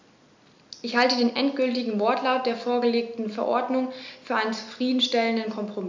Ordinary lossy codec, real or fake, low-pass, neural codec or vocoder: MP3, 64 kbps; real; 7.2 kHz; none